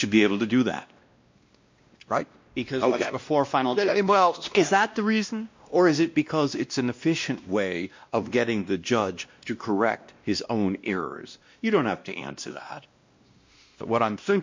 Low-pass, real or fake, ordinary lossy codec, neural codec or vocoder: 7.2 kHz; fake; MP3, 48 kbps; codec, 16 kHz, 1 kbps, X-Codec, WavLM features, trained on Multilingual LibriSpeech